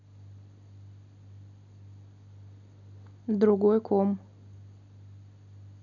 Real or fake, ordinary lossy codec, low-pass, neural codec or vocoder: real; none; 7.2 kHz; none